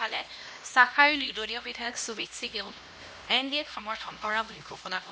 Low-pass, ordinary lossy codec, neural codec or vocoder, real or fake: none; none; codec, 16 kHz, 1 kbps, X-Codec, HuBERT features, trained on LibriSpeech; fake